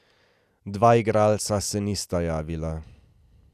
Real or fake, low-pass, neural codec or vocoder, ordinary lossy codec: real; 14.4 kHz; none; none